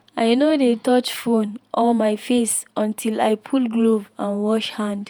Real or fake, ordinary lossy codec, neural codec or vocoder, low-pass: fake; none; vocoder, 48 kHz, 128 mel bands, Vocos; none